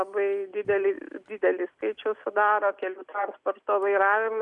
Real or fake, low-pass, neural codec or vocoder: real; 10.8 kHz; none